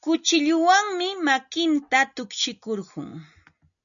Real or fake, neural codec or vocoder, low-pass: real; none; 7.2 kHz